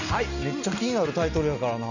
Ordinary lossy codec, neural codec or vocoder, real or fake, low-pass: none; none; real; 7.2 kHz